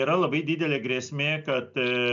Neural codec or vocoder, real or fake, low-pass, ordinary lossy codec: none; real; 7.2 kHz; MP3, 64 kbps